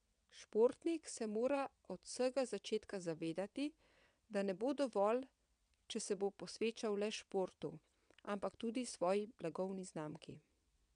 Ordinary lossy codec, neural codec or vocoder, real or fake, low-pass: none; vocoder, 22.05 kHz, 80 mel bands, WaveNeXt; fake; 9.9 kHz